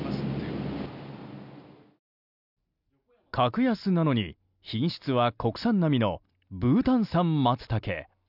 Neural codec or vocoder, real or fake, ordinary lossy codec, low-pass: none; real; none; 5.4 kHz